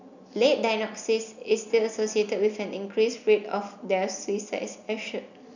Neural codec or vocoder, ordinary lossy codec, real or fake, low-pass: none; none; real; 7.2 kHz